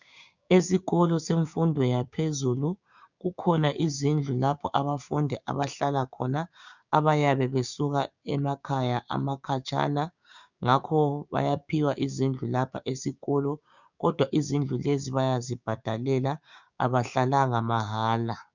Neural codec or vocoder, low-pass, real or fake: codec, 16 kHz, 6 kbps, DAC; 7.2 kHz; fake